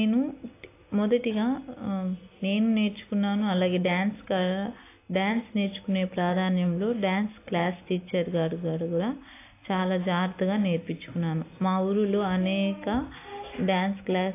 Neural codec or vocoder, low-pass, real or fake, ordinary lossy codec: none; 3.6 kHz; real; AAC, 24 kbps